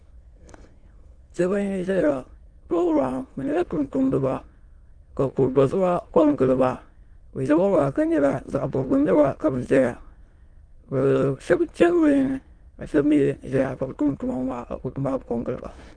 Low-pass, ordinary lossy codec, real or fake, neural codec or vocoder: 9.9 kHz; Opus, 32 kbps; fake; autoencoder, 22.05 kHz, a latent of 192 numbers a frame, VITS, trained on many speakers